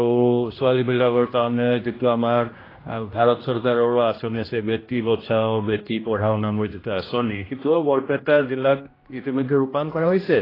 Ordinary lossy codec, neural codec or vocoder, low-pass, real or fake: AAC, 24 kbps; codec, 16 kHz, 1 kbps, X-Codec, HuBERT features, trained on general audio; 5.4 kHz; fake